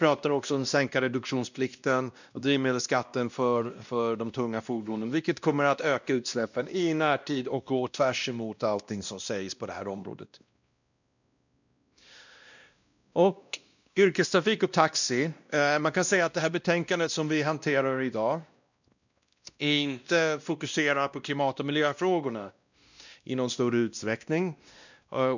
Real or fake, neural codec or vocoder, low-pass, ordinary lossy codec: fake; codec, 16 kHz, 1 kbps, X-Codec, WavLM features, trained on Multilingual LibriSpeech; 7.2 kHz; none